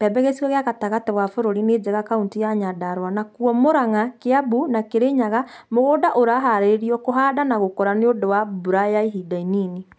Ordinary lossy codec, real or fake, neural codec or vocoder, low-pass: none; real; none; none